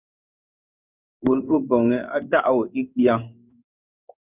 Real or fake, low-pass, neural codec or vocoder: fake; 3.6 kHz; codec, 16 kHz in and 24 kHz out, 1 kbps, XY-Tokenizer